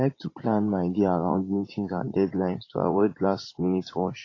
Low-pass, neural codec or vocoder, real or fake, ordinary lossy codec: 7.2 kHz; vocoder, 44.1 kHz, 80 mel bands, Vocos; fake; AAC, 32 kbps